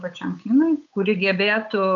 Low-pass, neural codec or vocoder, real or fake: 7.2 kHz; codec, 16 kHz, 8 kbps, FunCodec, trained on Chinese and English, 25 frames a second; fake